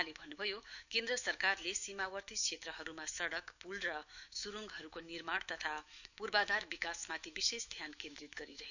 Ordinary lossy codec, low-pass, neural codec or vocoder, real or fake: none; 7.2 kHz; autoencoder, 48 kHz, 128 numbers a frame, DAC-VAE, trained on Japanese speech; fake